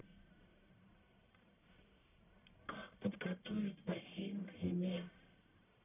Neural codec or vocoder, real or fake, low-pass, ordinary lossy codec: codec, 44.1 kHz, 1.7 kbps, Pupu-Codec; fake; 3.6 kHz; none